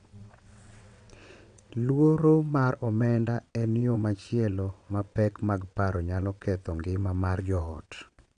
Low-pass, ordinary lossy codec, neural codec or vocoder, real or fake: 9.9 kHz; none; vocoder, 22.05 kHz, 80 mel bands, WaveNeXt; fake